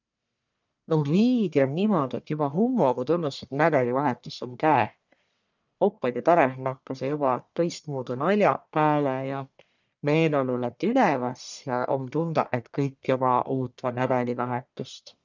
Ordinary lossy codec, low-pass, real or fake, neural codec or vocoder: none; 7.2 kHz; fake; codec, 44.1 kHz, 1.7 kbps, Pupu-Codec